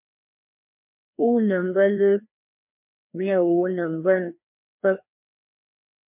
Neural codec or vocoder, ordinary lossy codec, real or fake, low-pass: codec, 16 kHz, 1 kbps, FreqCodec, larger model; MP3, 32 kbps; fake; 3.6 kHz